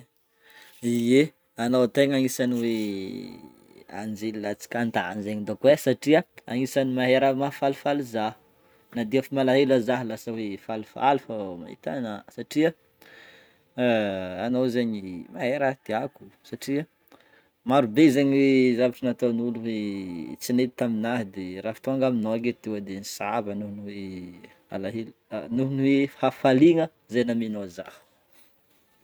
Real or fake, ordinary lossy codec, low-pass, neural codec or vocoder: real; none; none; none